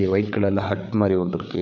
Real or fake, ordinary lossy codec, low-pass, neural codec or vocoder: fake; none; 7.2 kHz; codec, 16 kHz, 8 kbps, FreqCodec, larger model